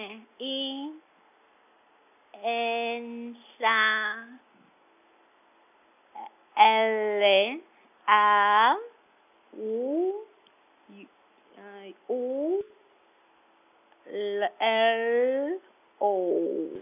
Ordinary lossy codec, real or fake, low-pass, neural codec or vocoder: none; real; 3.6 kHz; none